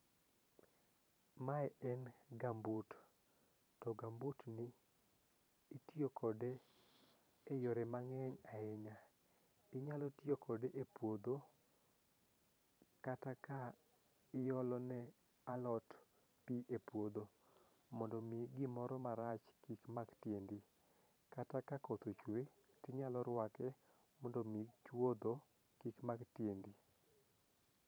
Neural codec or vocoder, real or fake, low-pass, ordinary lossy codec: vocoder, 44.1 kHz, 128 mel bands every 512 samples, BigVGAN v2; fake; none; none